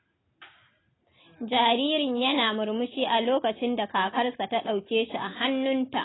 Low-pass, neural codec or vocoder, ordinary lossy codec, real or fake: 7.2 kHz; none; AAC, 16 kbps; real